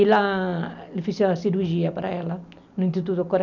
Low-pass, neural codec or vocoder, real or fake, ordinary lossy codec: 7.2 kHz; none; real; none